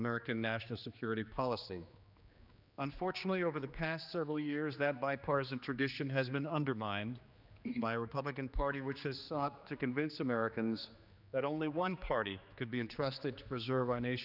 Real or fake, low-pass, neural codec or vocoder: fake; 5.4 kHz; codec, 16 kHz, 2 kbps, X-Codec, HuBERT features, trained on general audio